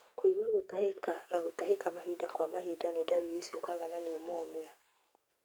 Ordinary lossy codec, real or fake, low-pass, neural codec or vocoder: none; fake; none; codec, 44.1 kHz, 2.6 kbps, SNAC